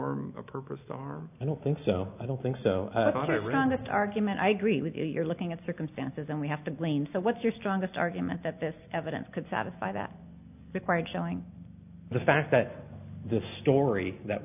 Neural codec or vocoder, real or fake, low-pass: none; real; 3.6 kHz